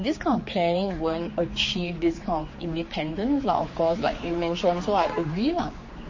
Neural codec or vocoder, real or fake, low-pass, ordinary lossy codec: codec, 16 kHz, 4 kbps, X-Codec, HuBERT features, trained on general audio; fake; 7.2 kHz; MP3, 32 kbps